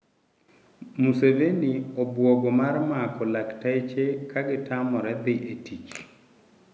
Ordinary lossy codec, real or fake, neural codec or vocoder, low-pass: none; real; none; none